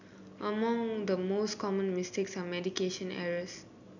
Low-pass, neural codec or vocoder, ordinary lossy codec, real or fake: 7.2 kHz; none; none; real